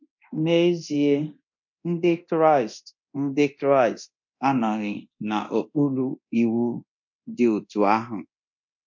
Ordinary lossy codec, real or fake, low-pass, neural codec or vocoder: MP3, 48 kbps; fake; 7.2 kHz; codec, 24 kHz, 0.9 kbps, DualCodec